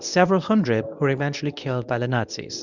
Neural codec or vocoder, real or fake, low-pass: codec, 24 kHz, 0.9 kbps, WavTokenizer, medium speech release version 1; fake; 7.2 kHz